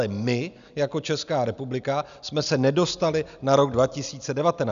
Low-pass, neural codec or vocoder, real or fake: 7.2 kHz; none; real